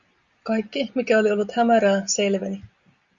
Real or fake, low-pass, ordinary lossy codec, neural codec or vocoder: real; 7.2 kHz; Opus, 64 kbps; none